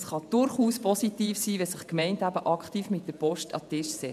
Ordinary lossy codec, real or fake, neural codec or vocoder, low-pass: MP3, 96 kbps; fake; vocoder, 48 kHz, 128 mel bands, Vocos; 14.4 kHz